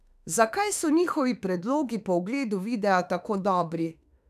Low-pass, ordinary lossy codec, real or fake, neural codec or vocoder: 14.4 kHz; none; fake; autoencoder, 48 kHz, 32 numbers a frame, DAC-VAE, trained on Japanese speech